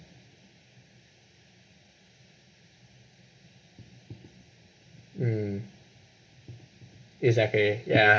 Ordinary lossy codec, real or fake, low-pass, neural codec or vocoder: none; real; none; none